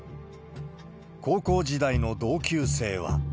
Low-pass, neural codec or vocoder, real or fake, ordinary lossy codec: none; none; real; none